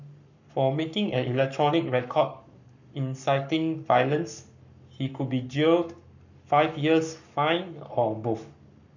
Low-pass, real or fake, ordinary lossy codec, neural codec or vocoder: 7.2 kHz; fake; none; codec, 44.1 kHz, 7.8 kbps, Pupu-Codec